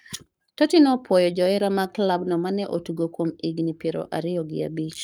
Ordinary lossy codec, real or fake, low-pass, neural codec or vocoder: none; fake; none; codec, 44.1 kHz, 7.8 kbps, Pupu-Codec